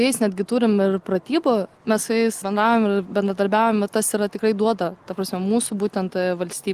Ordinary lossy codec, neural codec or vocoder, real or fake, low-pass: Opus, 24 kbps; none; real; 14.4 kHz